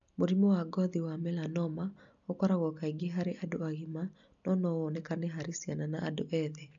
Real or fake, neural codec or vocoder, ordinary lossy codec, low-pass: real; none; none; 7.2 kHz